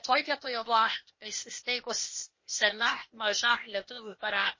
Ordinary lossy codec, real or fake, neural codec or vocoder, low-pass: MP3, 32 kbps; fake; codec, 16 kHz, 0.8 kbps, ZipCodec; 7.2 kHz